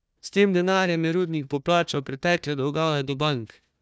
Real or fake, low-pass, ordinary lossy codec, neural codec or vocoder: fake; none; none; codec, 16 kHz, 1 kbps, FunCodec, trained on Chinese and English, 50 frames a second